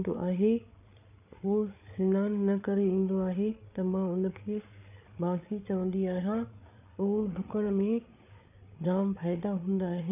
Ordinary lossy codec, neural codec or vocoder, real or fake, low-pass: none; codec, 16 kHz, 8 kbps, FreqCodec, larger model; fake; 3.6 kHz